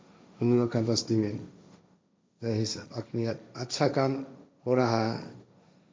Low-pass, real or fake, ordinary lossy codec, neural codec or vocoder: none; fake; none; codec, 16 kHz, 1.1 kbps, Voila-Tokenizer